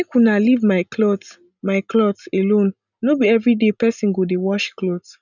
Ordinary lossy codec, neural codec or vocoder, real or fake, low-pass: none; none; real; 7.2 kHz